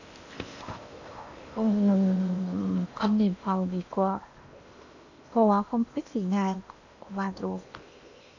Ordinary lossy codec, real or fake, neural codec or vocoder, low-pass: none; fake; codec, 16 kHz in and 24 kHz out, 0.8 kbps, FocalCodec, streaming, 65536 codes; 7.2 kHz